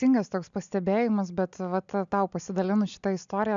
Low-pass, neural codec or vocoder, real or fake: 7.2 kHz; none; real